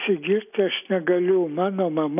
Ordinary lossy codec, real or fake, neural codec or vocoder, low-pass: AAC, 32 kbps; real; none; 3.6 kHz